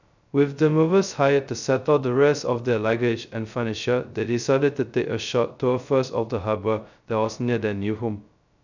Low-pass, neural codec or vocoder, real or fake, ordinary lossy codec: 7.2 kHz; codec, 16 kHz, 0.2 kbps, FocalCodec; fake; none